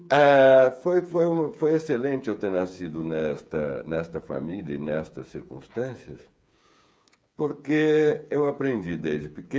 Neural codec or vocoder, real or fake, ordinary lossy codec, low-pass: codec, 16 kHz, 8 kbps, FreqCodec, smaller model; fake; none; none